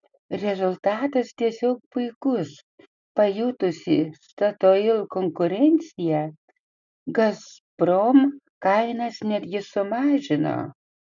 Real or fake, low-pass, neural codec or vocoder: real; 7.2 kHz; none